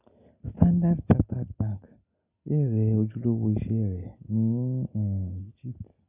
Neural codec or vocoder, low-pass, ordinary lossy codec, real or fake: none; 3.6 kHz; none; real